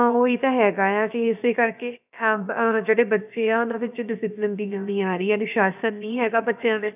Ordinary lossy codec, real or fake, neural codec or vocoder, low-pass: none; fake; codec, 16 kHz, about 1 kbps, DyCAST, with the encoder's durations; 3.6 kHz